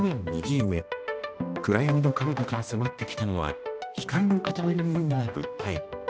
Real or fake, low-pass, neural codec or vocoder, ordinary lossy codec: fake; none; codec, 16 kHz, 1 kbps, X-Codec, HuBERT features, trained on balanced general audio; none